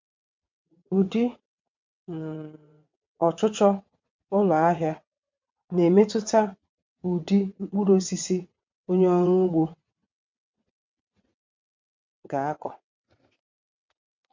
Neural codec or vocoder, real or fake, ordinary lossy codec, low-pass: vocoder, 22.05 kHz, 80 mel bands, WaveNeXt; fake; MP3, 64 kbps; 7.2 kHz